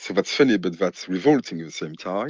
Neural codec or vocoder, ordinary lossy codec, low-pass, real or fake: none; Opus, 32 kbps; 7.2 kHz; real